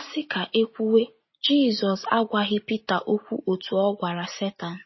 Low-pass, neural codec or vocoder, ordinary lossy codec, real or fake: 7.2 kHz; none; MP3, 24 kbps; real